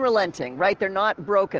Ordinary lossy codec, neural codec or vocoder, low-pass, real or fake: Opus, 16 kbps; none; 7.2 kHz; real